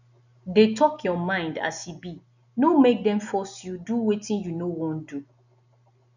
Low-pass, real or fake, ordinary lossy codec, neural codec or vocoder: 7.2 kHz; real; none; none